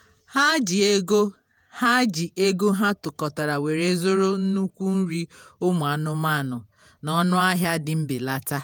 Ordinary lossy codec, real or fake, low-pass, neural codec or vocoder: none; fake; none; vocoder, 48 kHz, 128 mel bands, Vocos